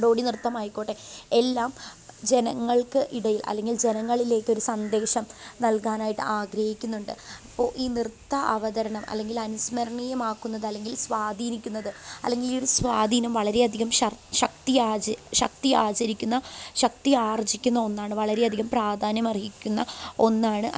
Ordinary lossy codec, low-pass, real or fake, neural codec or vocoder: none; none; real; none